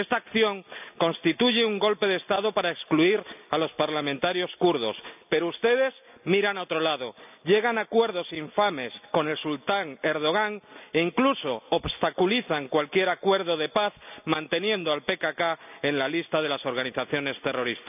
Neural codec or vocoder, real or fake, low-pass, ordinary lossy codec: none; real; 3.6 kHz; none